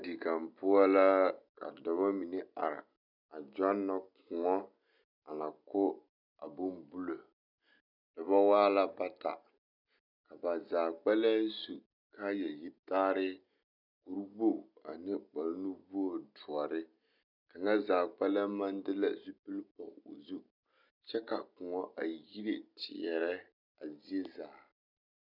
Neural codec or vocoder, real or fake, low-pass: none; real; 5.4 kHz